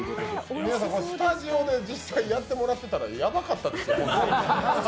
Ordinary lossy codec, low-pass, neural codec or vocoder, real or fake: none; none; none; real